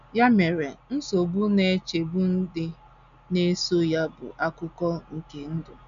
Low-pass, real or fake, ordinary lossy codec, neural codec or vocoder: 7.2 kHz; real; none; none